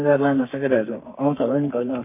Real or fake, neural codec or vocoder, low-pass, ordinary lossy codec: fake; codec, 32 kHz, 1.9 kbps, SNAC; 3.6 kHz; MP3, 24 kbps